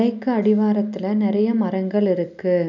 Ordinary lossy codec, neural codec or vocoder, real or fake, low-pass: none; none; real; 7.2 kHz